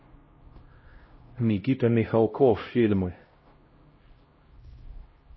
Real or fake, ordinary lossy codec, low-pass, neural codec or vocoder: fake; MP3, 24 kbps; 7.2 kHz; codec, 16 kHz, 0.5 kbps, X-Codec, HuBERT features, trained on LibriSpeech